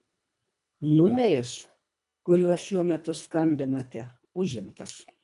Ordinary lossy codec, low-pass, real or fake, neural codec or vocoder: MP3, 96 kbps; 10.8 kHz; fake; codec, 24 kHz, 1.5 kbps, HILCodec